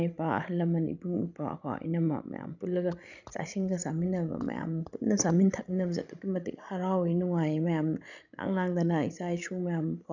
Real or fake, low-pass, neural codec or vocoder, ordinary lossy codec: fake; 7.2 kHz; codec, 16 kHz, 16 kbps, FreqCodec, larger model; none